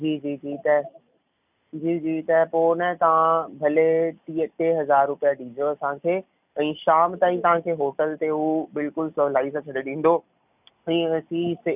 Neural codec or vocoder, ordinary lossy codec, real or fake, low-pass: none; none; real; 3.6 kHz